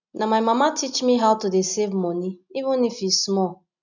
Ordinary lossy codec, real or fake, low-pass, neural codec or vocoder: none; real; 7.2 kHz; none